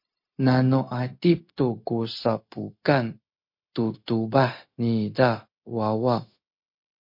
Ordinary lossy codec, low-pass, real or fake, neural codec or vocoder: MP3, 32 kbps; 5.4 kHz; fake; codec, 16 kHz, 0.4 kbps, LongCat-Audio-Codec